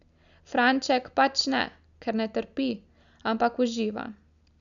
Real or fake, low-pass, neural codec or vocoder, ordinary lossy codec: real; 7.2 kHz; none; none